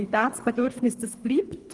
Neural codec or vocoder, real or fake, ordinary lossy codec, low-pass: codec, 24 kHz, 3 kbps, HILCodec; fake; Opus, 24 kbps; 10.8 kHz